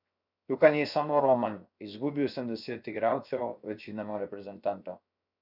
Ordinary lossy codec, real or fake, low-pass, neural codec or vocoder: none; fake; 5.4 kHz; codec, 16 kHz, 0.7 kbps, FocalCodec